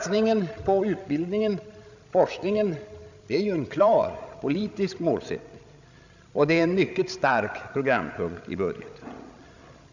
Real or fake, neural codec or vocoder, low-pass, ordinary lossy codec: fake; codec, 16 kHz, 16 kbps, FreqCodec, larger model; 7.2 kHz; none